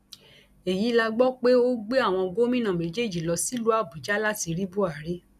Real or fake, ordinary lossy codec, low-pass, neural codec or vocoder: real; none; 14.4 kHz; none